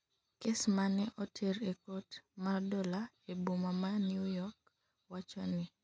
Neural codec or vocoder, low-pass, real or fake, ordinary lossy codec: none; none; real; none